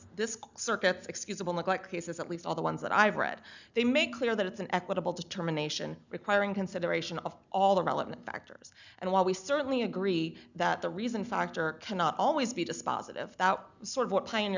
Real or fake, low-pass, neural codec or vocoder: real; 7.2 kHz; none